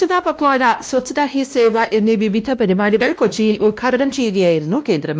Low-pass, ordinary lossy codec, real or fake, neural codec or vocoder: none; none; fake; codec, 16 kHz, 1 kbps, X-Codec, WavLM features, trained on Multilingual LibriSpeech